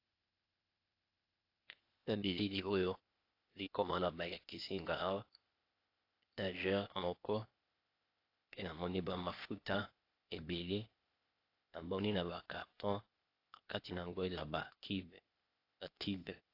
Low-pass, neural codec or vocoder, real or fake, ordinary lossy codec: 5.4 kHz; codec, 16 kHz, 0.8 kbps, ZipCodec; fake; AAC, 32 kbps